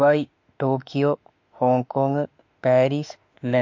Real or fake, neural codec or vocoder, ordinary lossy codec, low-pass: fake; autoencoder, 48 kHz, 32 numbers a frame, DAC-VAE, trained on Japanese speech; MP3, 48 kbps; 7.2 kHz